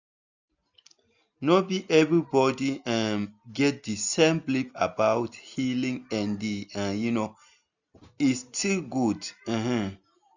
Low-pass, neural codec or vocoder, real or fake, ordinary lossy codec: 7.2 kHz; none; real; none